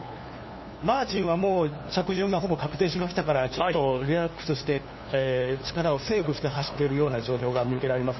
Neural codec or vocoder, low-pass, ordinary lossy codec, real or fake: codec, 16 kHz, 2 kbps, FunCodec, trained on LibriTTS, 25 frames a second; 7.2 kHz; MP3, 24 kbps; fake